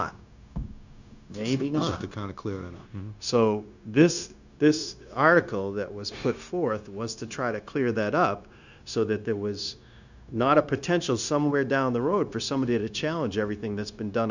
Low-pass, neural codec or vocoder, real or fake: 7.2 kHz; codec, 16 kHz, 0.9 kbps, LongCat-Audio-Codec; fake